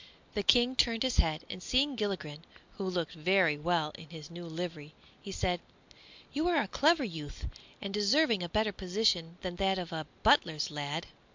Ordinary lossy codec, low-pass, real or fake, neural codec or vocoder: MP3, 64 kbps; 7.2 kHz; real; none